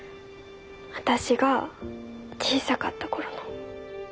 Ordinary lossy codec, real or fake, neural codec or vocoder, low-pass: none; real; none; none